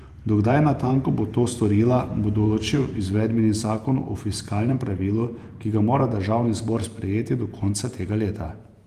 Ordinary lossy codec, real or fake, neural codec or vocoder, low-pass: Opus, 32 kbps; fake; vocoder, 48 kHz, 128 mel bands, Vocos; 14.4 kHz